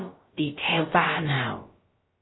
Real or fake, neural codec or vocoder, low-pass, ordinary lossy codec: fake; codec, 16 kHz, about 1 kbps, DyCAST, with the encoder's durations; 7.2 kHz; AAC, 16 kbps